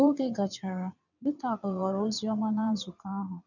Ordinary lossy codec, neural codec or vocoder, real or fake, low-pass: none; vocoder, 22.05 kHz, 80 mel bands, WaveNeXt; fake; 7.2 kHz